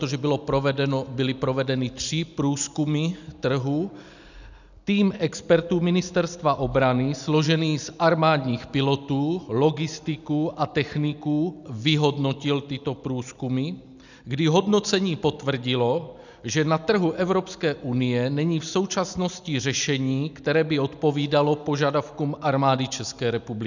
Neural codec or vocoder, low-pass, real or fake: none; 7.2 kHz; real